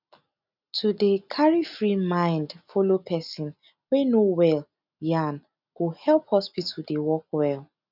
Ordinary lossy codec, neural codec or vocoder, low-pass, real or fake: none; none; 5.4 kHz; real